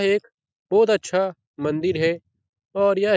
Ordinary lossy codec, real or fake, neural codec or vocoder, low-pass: none; real; none; none